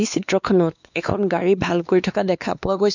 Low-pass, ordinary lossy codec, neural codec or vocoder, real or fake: 7.2 kHz; none; codec, 16 kHz, 2 kbps, X-Codec, WavLM features, trained on Multilingual LibriSpeech; fake